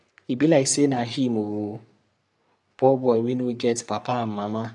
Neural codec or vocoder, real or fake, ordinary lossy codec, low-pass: codec, 44.1 kHz, 3.4 kbps, Pupu-Codec; fake; none; 10.8 kHz